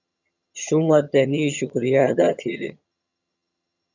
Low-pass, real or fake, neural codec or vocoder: 7.2 kHz; fake; vocoder, 22.05 kHz, 80 mel bands, HiFi-GAN